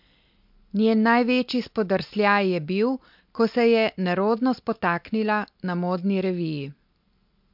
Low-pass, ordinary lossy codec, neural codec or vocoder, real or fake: 5.4 kHz; MP3, 48 kbps; none; real